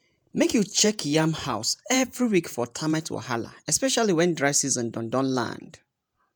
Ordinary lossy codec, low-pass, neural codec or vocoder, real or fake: none; none; vocoder, 48 kHz, 128 mel bands, Vocos; fake